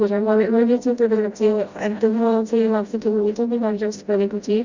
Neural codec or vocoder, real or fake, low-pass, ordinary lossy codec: codec, 16 kHz, 0.5 kbps, FreqCodec, smaller model; fake; 7.2 kHz; Opus, 64 kbps